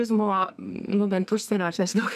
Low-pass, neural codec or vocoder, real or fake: 14.4 kHz; codec, 44.1 kHz, 2.6 kbps, SNAC; fake